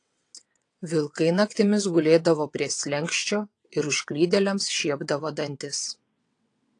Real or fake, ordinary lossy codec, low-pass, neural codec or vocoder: fake; AAC, 48 kbps; 9.9 kHz; vocoder, 22.05 kHz, 80 mel bands, WaveNeXt